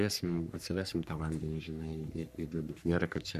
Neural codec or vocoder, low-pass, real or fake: codec, 44.1 kHz, 3.4 kbps, Pupu-Codec; 14.4 kHz; fake